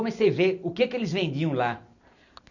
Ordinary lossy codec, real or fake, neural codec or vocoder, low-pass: none; real; none; 7.2 kHz